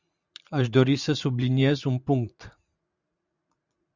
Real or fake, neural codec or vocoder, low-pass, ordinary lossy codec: fake; vocoder, 44.1 kHz, 80 mel bands, Vocos; 7.2 kHz; Opus, 64 kbps